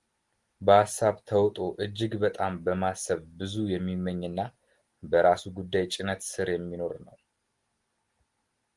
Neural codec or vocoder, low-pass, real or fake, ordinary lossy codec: none; 10.8 kHz; real; Opus, 24 kbps